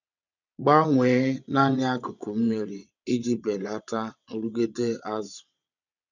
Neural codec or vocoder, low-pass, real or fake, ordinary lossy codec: vocoder, 22.05 kHz, 80 mel bands, WaveNeXt; 7.2 kHz; fake; none